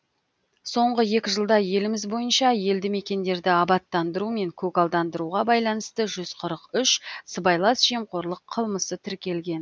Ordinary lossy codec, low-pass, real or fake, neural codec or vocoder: none; none; real; none